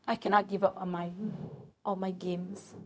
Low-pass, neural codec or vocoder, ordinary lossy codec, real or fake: none; codec, 16 kHz, 0.4 kbps, LongCat-Audio-Codec; none; fake